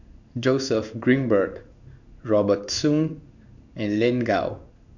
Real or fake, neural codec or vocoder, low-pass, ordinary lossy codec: fake; codec, 16 kHz in and 24 kHz out, 1 kbps, XY-Tokenizer; 7.2 kHz; none